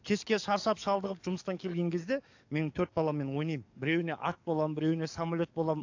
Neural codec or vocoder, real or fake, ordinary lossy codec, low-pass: codec, 44.1 kHz, 7.8 kbps, DAC; fake; AAC, 48 kbps; 7.2 kHz